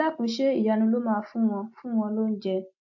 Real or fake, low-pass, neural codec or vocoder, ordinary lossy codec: real; 7.2 kHz; none; AAC, 48 kbps